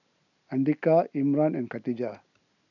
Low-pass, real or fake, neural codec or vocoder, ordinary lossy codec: 7.2 kHz; real; none; none